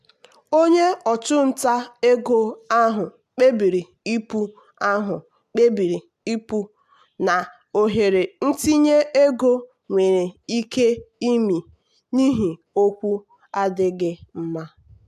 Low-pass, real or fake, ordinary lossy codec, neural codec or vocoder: 14.4 kHz; real; AAC, 96 kbps; none